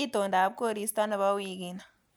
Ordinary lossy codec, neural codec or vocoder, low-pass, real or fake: none; none; none; real